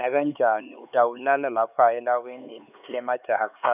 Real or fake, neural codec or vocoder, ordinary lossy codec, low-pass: fake; codec, 16 kHz, 4 kbps, X-Codec, HuBERT features, trained on LibriSpeech; none; 3.6 kHz